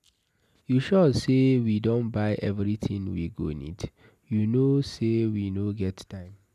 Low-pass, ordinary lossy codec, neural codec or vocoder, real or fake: 14.4 kHz; none; none; real